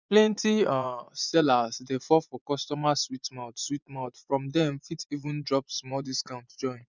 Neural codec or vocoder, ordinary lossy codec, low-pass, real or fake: vocoder, 24 kHz, 100 mel bands, Vocos; none; 7.2 kHz; fake